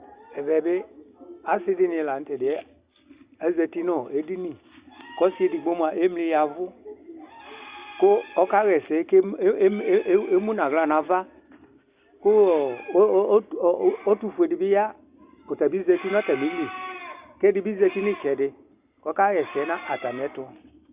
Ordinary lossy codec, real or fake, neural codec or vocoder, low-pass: Opus, 64 kbps; real; none; 3.6 kHz